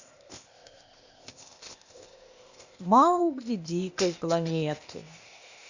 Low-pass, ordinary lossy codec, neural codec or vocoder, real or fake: 7.2 kHz; Opus, 64 kbps; codec, 16 kHz, 0.8 kbps, ZipCodec; fake